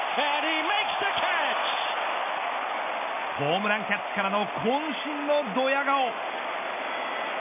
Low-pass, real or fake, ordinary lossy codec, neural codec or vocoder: 3.6 kHz; real; none; none